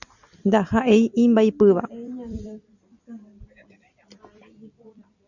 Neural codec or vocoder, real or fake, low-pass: vocoder, 44.1 kHz, 128 mel bands every 256 samples, BigVGAN v2; fake; 7.2 kHz